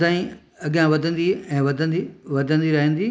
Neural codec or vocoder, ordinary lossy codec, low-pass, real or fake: none; none; none; real